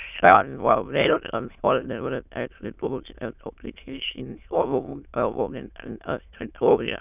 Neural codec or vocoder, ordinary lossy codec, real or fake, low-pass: autoencoder, 22.05 kHz, a latent of 192 numbers a frame, VITS, trained on many speakers; none; fake; 3.6 kHz